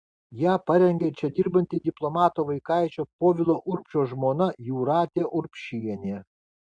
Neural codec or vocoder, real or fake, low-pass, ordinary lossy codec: none; real; 9.9 kHz; Opus, 64 kbps